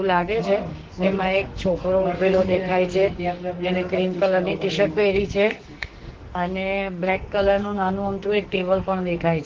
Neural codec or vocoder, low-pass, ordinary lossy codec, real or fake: codec, 44.1 kHz, 2.6 kbps, SNAC; 7.2 kHz; Opus, 16 kbps; fake